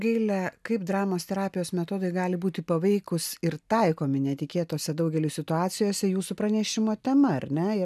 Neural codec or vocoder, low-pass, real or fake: none; 14.4 kHz; real